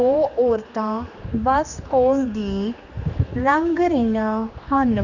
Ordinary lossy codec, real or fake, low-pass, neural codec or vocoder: none; fake; 7.2 kHz; codec, 16 kHz, 2 kbps, X-Codec, HuBERT features, trained on general audio